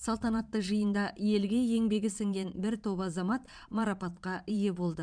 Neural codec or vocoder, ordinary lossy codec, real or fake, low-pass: vocoder, 22.05 kHz, 80 mel bands, Vocos; none; fake; 9.9 kHz